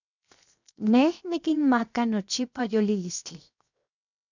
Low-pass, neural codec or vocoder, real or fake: 7.2 kHz; codec, 16 kHz, 0.7 kbps, FocalCodec; fake